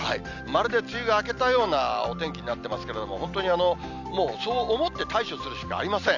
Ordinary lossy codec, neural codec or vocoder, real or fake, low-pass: none; none; real; 7.2 kHz